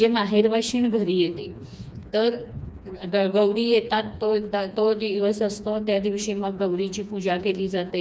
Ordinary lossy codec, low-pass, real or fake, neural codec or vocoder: none; none; fake; codec, 16 kHz, 2 kbps, FreqCodec, smaller model